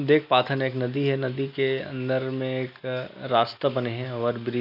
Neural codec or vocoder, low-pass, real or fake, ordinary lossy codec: none; 5.4 kHz; real; none